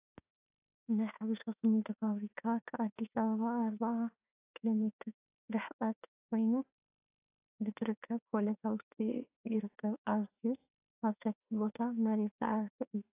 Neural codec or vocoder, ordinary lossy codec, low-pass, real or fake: autoencoder, 48 kHz, 32 numbers a frame, DAC-VAE, trained on Japanese speech; AAC, 24 kbps; 3.6 kHz; fake